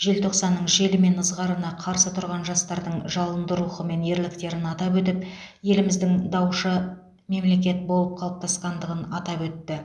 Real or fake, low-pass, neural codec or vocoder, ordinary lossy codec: real; none; none; none